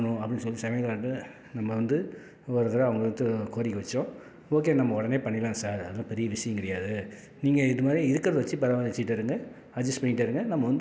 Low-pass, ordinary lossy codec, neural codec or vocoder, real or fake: none; none; none; real